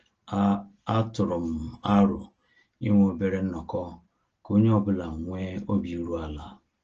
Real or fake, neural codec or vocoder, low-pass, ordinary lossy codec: real; none; 7.2 kHz; Opus, 16 kbps